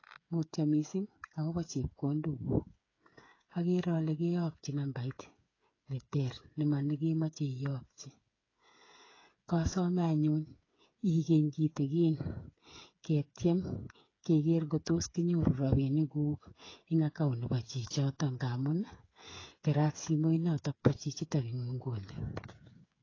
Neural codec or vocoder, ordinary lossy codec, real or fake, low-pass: codec, 16 kHz, 8 kbps, FreqCodec, smaller model; AAC, 32 kbps; fake; 7.2 kHz